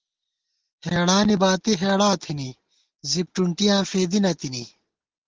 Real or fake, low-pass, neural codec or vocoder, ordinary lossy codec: real; 7.2 kHz; none; Opus, 16 kbps